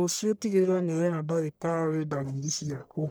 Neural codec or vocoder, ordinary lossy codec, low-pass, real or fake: codec, 44.1 kHz, 1.7 kbps, Pupu-Codec; none; none; fake